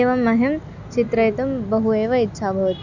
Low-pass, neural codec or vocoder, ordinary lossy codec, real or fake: 7.2 kHz; none; none; real